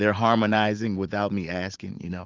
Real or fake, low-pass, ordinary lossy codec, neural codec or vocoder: fake; 7.2 kHz; Opus, 24 kbps; vocoder, 44.1 kHz, 80 mel bands, Vocos